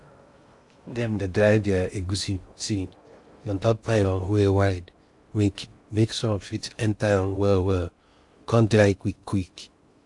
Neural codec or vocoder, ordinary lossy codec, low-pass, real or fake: codec, 16 kHz in and 24 kHz out, 0.6 kbps, FocalCodec, streaming, 2048 codes; AAC, 64 kbps; 10.8 kHz; fake